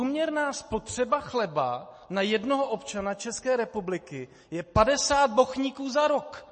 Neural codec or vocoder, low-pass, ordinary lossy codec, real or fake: none; 10.8 kHz; MP3, 32 kbps; real